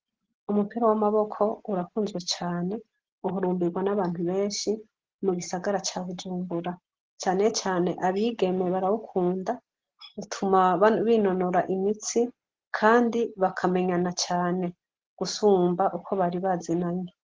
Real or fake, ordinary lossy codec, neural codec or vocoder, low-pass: real; Opus, 16 kbps; none; 7.2 kHz